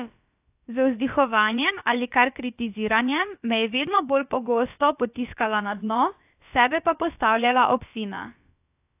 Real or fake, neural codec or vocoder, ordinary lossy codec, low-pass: fake; codec, 16 kHz, about 1 kbps, DyCAST, with the encoder's durations; AAC, 32 kbps; 3.6 kHz